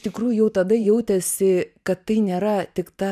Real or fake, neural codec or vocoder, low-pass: fake; vocoder, 44.1 kHz, 128 mel bands every 512 samples, BigVGAN v2; 14.4 kHz